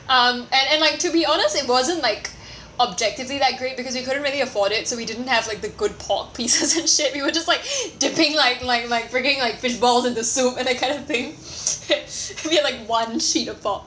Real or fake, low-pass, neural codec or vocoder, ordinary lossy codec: real; none; none; none